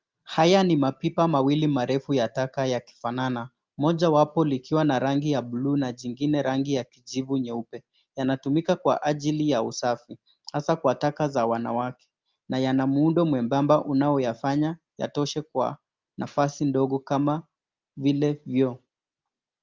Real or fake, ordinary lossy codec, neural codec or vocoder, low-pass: real; Opus, 24 kbps; none; 7.2 kHz